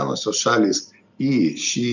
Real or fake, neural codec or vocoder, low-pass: real; none; 7.2 kHz